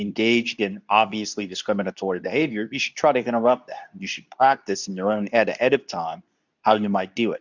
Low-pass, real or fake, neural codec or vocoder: 7.2 kHz; fake; codec, 24 kHz, 0.9 kbps, WavTokenizer, medium speech release version 2